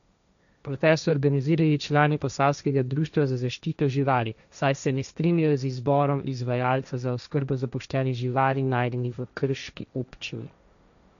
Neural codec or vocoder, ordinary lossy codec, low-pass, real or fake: codec, 16 kHz, 1.1 kbps, Voila-Tokenizer; none; 7.2 kHz; fake